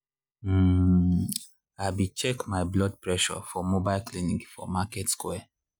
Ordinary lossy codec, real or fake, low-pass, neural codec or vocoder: none; real; none; none